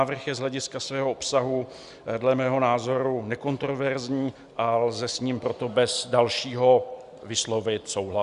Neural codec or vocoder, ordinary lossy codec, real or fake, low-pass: none; Opus, 64 kbps; real; 10.8 kHz